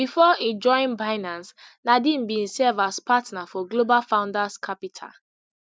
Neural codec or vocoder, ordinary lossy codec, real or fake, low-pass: none; none; real; none